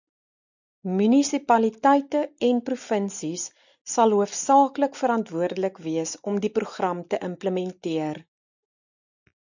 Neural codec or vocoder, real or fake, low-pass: none; real; 7.2 kHz